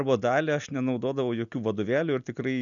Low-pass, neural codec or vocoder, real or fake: 7.2 kHz; none; real